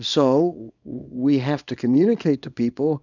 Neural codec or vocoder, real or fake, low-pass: codec, 24 kHz, 0.9 kbps, WavTokenizer, small release; fake; 7.2 kHz